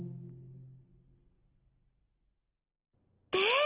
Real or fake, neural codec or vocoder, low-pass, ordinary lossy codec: real; none; 3.6 kHz; Opus, 16 kbps